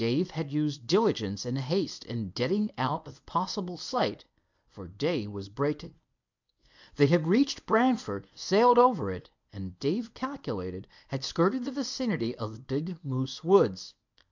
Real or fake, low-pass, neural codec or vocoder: fake; 7.2 kHz; codec, 24 kHz, 0.9 kbps, WavTokenizer, medium speech release version 1